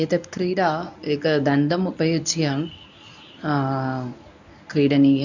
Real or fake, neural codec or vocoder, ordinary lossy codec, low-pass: fake; codec, 24 kHz, 0.9 kbps, WavTokenizer, medium speech release version 1; none; 7.2 kHz